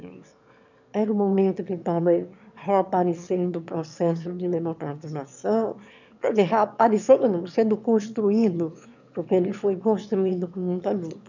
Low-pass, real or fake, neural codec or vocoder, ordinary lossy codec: 7.2 kHz; fake; autoencoder, 22.05 kHz, a latent of 192 numbers a frame, VITS, trained on one speaker; none